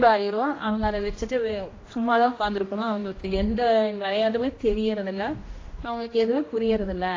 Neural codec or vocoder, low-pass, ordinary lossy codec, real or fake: codec, 16 kHz, 1 kbps, X-Codec, HuBERT features, trained on general audio; 7.2 kHz; AAC, 32 kbps; fake